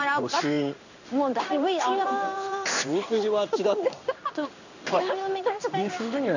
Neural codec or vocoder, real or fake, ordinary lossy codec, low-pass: codec, 16 kHz in and 24 kHz out, 1 kbps, XY-Tokenizer; fake; none; 7.2 kHz